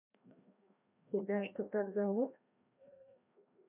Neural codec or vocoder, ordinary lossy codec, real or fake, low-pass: codec, 16 kHz, 1 kbps, FreqCodec, larger model; none; fake; 3.6 kHz